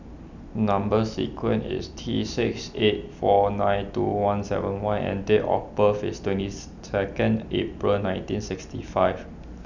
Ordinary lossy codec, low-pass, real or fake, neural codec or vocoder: none; 7.2 kHz; real; none